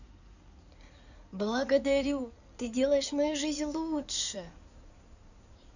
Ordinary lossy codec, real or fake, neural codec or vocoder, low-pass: none; fake; codec, 16 kHz in and 24 kHz out, 2.2 kbps, FireRedTTS-2 codec; 7.2 kHz